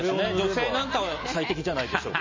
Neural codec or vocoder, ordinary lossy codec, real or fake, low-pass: none; MP3, 32 kbps; real; 7.2 kHz